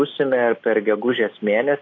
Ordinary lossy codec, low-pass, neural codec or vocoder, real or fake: AAC, 32 kbps; 7.2 kHz; none; real